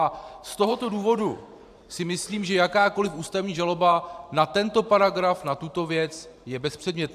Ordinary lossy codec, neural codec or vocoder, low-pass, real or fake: AAC, 96 kbps; vocoder, 44.1 kHz, 128 mel bands every 512 samples, BigVGAN v2; 14.4 kHz; fake